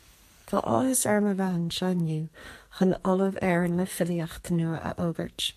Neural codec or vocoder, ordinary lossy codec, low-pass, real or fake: codec, 44.1 kHz, 2.6 kbps, SNAC; MP3, 64 kbps; 14.4 kHz; fake